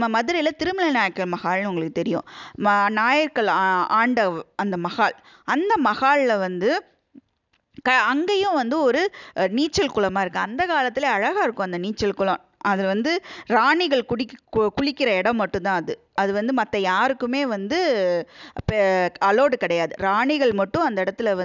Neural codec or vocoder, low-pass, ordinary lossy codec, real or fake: none; 7.2 kHz; none; real